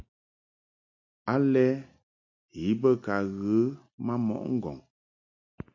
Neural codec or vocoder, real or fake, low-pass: none; real; 7.2 kHz